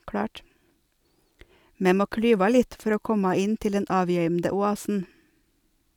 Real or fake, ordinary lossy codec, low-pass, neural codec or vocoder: real; none; 19.8 kHz; none